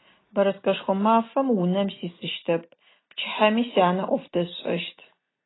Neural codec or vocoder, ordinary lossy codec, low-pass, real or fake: none; AAC, 16 kbps; 7.2 kHz; real